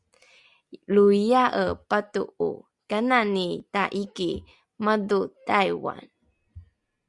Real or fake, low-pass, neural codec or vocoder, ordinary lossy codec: real; 10.8 kHz; none; Opus, 64 kbps